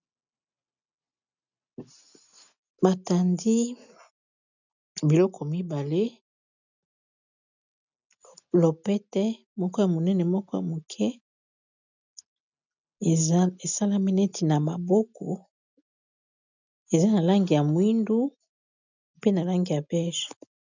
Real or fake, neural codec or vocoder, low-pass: real; none; 7.2 kHz